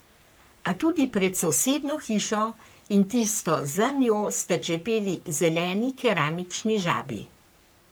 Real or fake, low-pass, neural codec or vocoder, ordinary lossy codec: fake; none; codec, 44.1 kHz, 3.4 kbps, Pupu-Codec; none